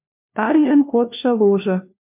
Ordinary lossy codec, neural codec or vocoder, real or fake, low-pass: MP3, 32 kbps; codec, 16 kHz, 1 kbps, FunCodec, trained on LibriTTS, 50 frames a second; fake; 3.6 kHz